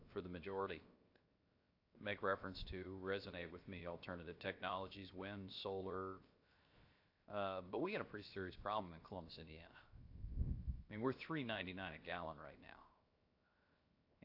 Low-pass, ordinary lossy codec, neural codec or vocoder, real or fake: 5.4 kHz; AAC, 32 kbps; codec, 16 kHz, 0.7 kbps, FocalCodec; fake